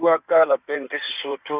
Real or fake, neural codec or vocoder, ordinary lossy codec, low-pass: fake; codec, 16 kHz in and 24 kHz out, 2.2 kbps, FireRedTTS-2 codec; Opus, 64 kbps; 3.6 kHz